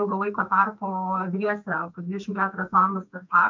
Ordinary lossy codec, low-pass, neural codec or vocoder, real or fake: MP3, 48 kbps; 7.2 kHz; codec, 44.1 kHz, 2.6 kbps, SNAC; fake